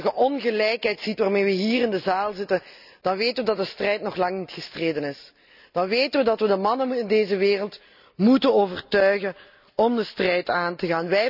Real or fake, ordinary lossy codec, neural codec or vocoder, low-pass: real; none; none; 5.4 kHz